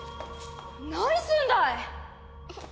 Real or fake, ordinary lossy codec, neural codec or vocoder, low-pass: real; none; none; none